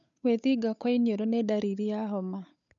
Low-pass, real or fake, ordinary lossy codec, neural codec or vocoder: 7.2 kHz; fake; none; codec, 16 kHz, 8 kbps, FunCodec, trained on Chinese and English, 25 frames a second